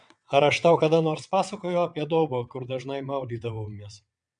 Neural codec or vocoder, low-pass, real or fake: vocoder, 22.05 kHz, 80 mel bands, WaveNeXt; 9.9 kHz; fake